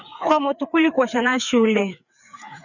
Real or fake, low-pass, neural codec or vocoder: fake; 7.2 kHz; codec, 16 kHz, 4 kbps, FreqCodec, larger model